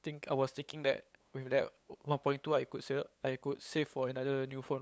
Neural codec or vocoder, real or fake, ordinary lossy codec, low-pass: codec, 16 kHz, 8 kbps, FunCodec, trained on LibriTTS, 25 frames a second; fake; none; none